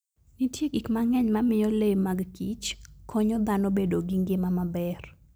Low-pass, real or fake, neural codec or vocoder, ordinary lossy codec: none; real; none; none